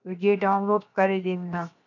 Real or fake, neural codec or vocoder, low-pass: fake; codec, 16 kHz, 0.7 kbps, FocalCodec; 7.2 kHz